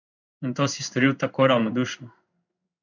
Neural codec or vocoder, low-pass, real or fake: codec, 16 kHz in and 24 kHz out, 1 kbps, XY-Tokenizer; 7.2 kHz; fake